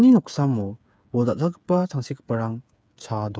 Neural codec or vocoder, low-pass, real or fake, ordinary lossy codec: codec, 16 kHz, 8 kbps, FreqCodec, smaller model; none; fake; none